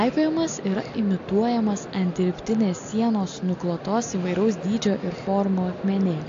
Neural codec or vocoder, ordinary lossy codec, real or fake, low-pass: none; AAC, 48 kbps; real; 7.2 kHz